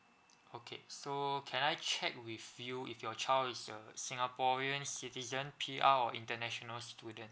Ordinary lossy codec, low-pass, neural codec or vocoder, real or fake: none; none; none; real